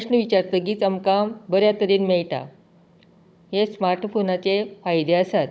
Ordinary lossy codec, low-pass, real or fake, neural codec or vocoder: none; none; fake; codec, 16 kHz, 16 kbps, FunCodec, trained on Chinese and English, 50 frames a second